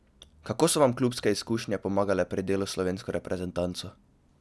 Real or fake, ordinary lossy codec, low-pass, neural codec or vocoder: real; none; none; none